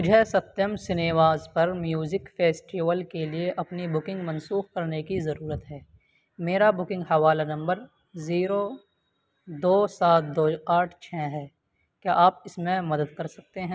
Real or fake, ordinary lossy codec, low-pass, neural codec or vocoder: real; none; none; none